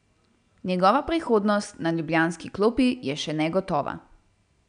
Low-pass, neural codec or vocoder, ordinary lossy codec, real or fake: 9.9 kHz; none; none; real